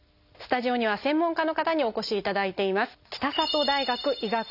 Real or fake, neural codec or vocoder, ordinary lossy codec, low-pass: real; none; none; 5.4 kHz